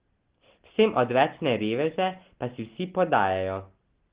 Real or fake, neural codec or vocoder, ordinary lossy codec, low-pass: real; none; Opus, 16 kbps; 3.6 kHz